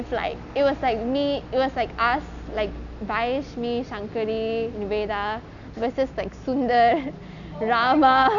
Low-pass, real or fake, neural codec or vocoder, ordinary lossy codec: 7.2 kHz; real; none; none